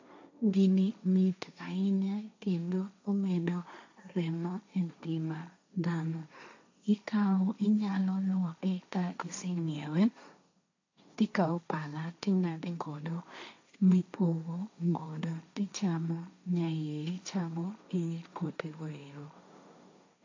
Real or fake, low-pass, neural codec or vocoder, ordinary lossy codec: fake; 7.2 kHz; codec, 16 kHz, 1.1 kbps, Voila-Tokenizer; AAC, 48 kbps